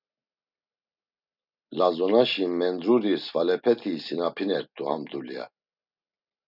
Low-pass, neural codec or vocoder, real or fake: 5.4 kHz; none; real